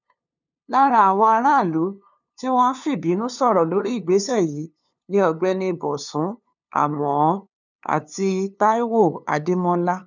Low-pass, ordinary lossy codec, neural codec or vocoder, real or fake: 7.2 kHz; none; codec, 16 kHz, 2 kbps, FunCodec, trained on LibriTTS, 25 frames a second; fake